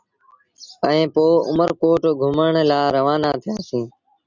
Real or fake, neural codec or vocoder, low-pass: real; none; 7.2 kHz